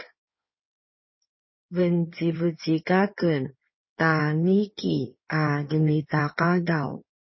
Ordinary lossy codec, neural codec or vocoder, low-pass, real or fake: MP3, 24 kbps; codec, 16 kHz in and 24 kHz out, 2.2 kbps, FireRedTTS-2 codec; 7.2 kHz; fake